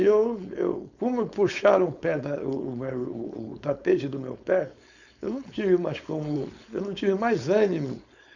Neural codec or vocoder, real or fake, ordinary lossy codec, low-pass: codec, 16 kHz, 4.8 kbps, FACodec; fake; none; 7.2 kHz